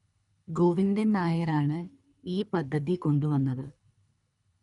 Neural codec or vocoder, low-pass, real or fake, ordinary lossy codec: codec, 24 kHz, 3 kbps, HILCodec; 10.8 kHz; fake; none